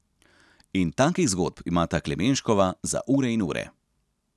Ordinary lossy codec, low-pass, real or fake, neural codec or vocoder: none; none; real; none